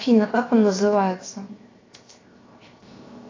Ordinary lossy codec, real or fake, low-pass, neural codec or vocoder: AAC, 32 kbps; fake; 7.2 kHz; codec, 16 kHz, 0.7 kbps, FocalCodec